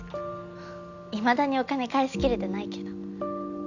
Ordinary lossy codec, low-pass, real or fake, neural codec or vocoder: none; 7.2 kHz; real; none